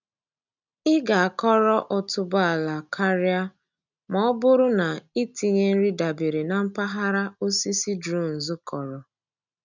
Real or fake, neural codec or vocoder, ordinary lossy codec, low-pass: real; none; none; 7.2 kHz